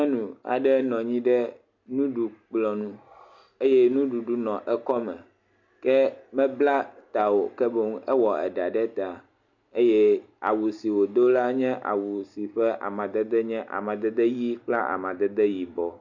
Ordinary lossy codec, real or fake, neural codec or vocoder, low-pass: MP3, 48 kbps; real; none; 7.2 kHz